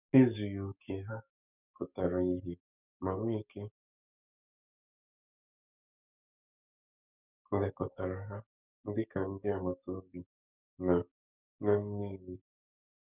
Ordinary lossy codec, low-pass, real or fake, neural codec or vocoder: none; 3.6 kHz; fake; codec, 44.1 kHz, 7.8 kbps, DAC